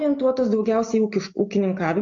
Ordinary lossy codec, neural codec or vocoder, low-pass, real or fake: MP3, 48 kbps; none; 7.2 kHz; real